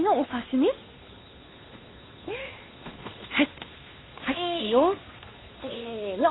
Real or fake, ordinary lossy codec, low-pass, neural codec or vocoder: fake; AAC, 16 kbps; 7.2 kHz; codec, 16 kHz, 2 kbps, FunCodec, trained on Chinese and English, 25 frames a second